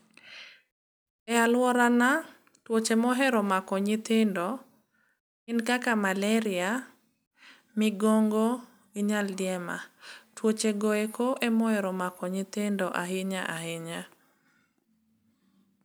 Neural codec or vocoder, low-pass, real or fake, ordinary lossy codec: none; none; real; none